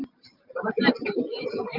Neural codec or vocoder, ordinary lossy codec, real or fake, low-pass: none; Opus, 32 kbps; real; 5.4 kHz